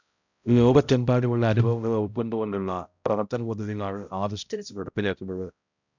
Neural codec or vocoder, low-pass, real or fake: codec, 16 kHz, 0.5 kbps, X-Codec, HuBERT features, trained on balanced general audio; 7.2 kHz; fake